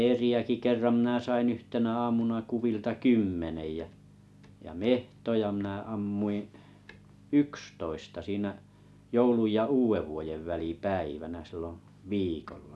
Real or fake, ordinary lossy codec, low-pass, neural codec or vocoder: real; none; none; none